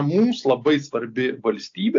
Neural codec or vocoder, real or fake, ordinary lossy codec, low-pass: none; real; AAC, 48 kbps; 7.2 kHz